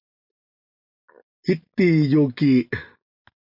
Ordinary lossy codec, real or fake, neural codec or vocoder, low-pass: MP3, 48 kbps; real; none; 5.4 kHz